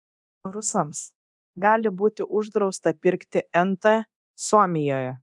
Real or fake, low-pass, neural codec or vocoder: fake; 10.8 kHz; codec, 24 kHz, 0.9 kbps, DualCodec